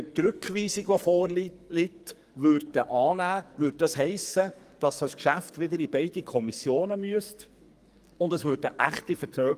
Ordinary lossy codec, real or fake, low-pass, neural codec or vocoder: Opus, 32 kbps; fake; 14.4 kHz; codec, 44.1 kHz, 2.6 kbps, SNAC